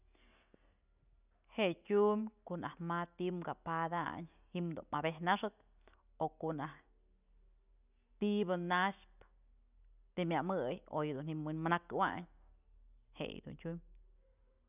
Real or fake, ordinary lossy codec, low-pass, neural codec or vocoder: real; none; 3.6 kHz; none